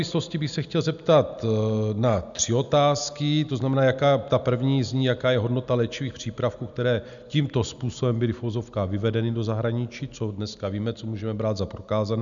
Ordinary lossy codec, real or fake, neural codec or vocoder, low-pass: MP3, 96 kbps; real; none; 7.2 kHz